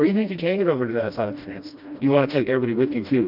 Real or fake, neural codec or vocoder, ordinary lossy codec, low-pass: fake; codec, 16 kHz, 1 kbps, FreqCodec, smaller model; AAC, 48 kbps; 5.4 kHz